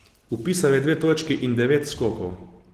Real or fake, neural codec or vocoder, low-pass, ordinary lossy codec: real; none; 14.4 kHz; Opus, 16 kbps